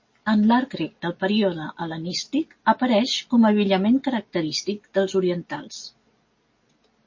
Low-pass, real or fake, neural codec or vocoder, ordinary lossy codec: 7.2 kHz; real; none; MP3, 32 kbps